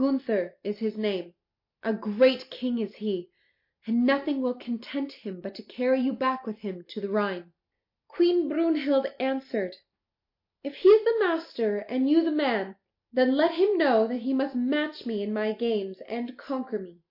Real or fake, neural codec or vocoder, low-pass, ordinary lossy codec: real; none; 5.4 kHz; MP3, 32 kbps